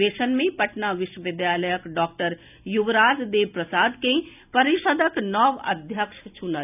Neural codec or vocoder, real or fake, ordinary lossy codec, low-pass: none; real; none; 3.6 kHz